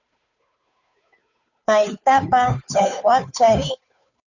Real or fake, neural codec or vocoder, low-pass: fake; codec, 16 kHz, 2 kbps, FunCodec, trained on Chinese and English, 25 frames a second; 7.2 kHz